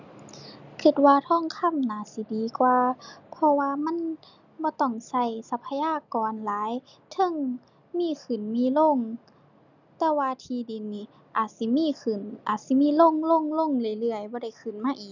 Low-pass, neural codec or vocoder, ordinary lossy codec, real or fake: 7.2 kHz; none; none; real